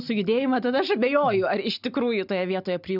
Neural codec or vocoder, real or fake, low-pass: none; real; 5.4 kHz